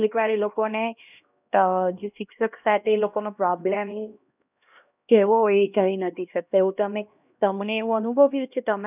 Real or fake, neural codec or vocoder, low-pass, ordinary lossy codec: fake; codec, 16 kHz, 1 kbps, X-Codec, HuBERT features, trained on LibriSpeech; 3.6 kHz; none